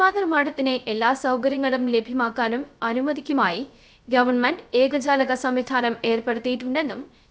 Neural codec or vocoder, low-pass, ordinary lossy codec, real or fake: codec, 16 kHz, 0.3 kbps, FocalCodec; none; none; fake